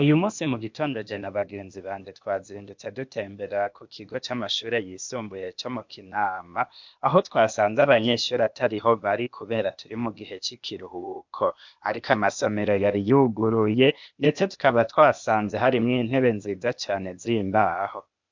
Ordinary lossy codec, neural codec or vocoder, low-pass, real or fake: MP3, 64 kbps; codec, 16 kHz, 0.8 kbps, ZipCodec; 7.2 kHz; fake